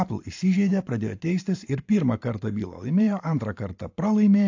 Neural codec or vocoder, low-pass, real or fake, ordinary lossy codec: vocoder, 44.1 kHz, 128 mel bands every 512 samples, BigVGAN v2; 7.2 kHz; fake; AAC, 48 kbps